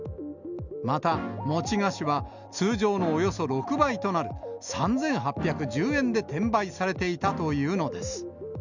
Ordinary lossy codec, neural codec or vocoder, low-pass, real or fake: none; none; 7.2 kHz; real